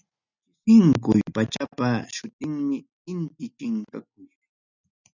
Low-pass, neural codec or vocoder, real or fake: 7.2 kHz; none; real